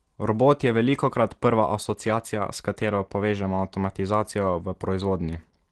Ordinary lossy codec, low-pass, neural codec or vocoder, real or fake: Opus, 16 kbps; 10.8 kHz; none; real